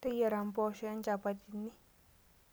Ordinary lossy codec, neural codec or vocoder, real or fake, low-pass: none; none; real; none